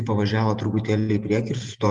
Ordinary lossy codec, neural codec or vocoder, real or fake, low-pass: Opus, 32 kbps; vocoder, 48 kHz, 128 mel bands, Vocos; fake; 10.8 kHz